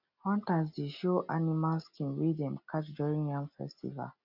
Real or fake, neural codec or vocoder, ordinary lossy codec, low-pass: real; none; none; 5.4 kHz